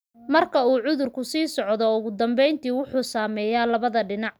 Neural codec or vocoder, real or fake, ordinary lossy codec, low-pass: none; real; none; none